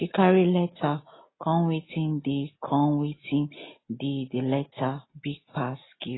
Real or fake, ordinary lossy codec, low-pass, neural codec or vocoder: real; AAC, 16 kbps; 7.2 kHz; none